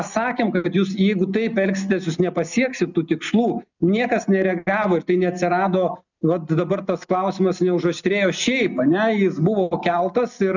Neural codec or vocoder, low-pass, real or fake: none; 7.2 kHz; real